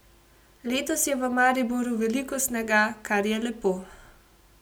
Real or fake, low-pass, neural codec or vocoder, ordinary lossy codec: real; none; none; none